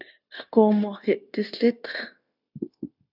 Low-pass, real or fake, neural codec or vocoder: 5.4 kHz; fake; codec, 16 kHz, 0.9 kbps, LongCat-Audio-Codec